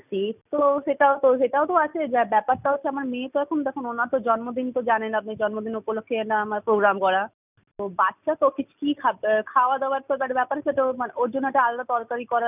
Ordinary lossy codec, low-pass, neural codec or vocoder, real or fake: none; 3.6 kHz; none; real